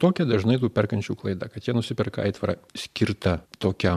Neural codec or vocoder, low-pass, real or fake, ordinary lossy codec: vocoder, 44.1 kHz, 128 mel bands every 512 samples, BigVGAN v2; 14.4 kHz; fake; MP3, 96 kbps